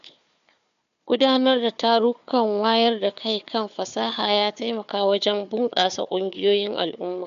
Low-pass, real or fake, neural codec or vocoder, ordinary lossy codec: 7.2 kHz; fake; codec, 16 kHz, 4 kbps, FunCodec, trained on Chinese and English, 50 frames a second; none